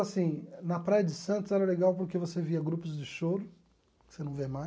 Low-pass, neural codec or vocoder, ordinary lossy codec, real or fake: none; none; none; real